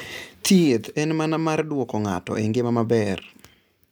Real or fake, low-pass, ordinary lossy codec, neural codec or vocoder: real; none; none; none